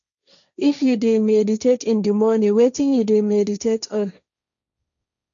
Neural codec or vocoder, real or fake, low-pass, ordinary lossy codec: codec, 16 kHz, 1.1 kbps, Voila-Tokenizer; fake; 7.2 kHz; none